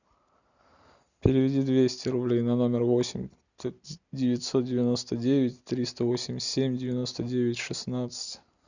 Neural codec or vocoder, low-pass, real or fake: none; 7.2 kHz; real